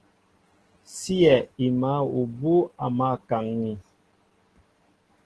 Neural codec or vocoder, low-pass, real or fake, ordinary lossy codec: none; 10.8 kHz; real; Opus, 16 kbps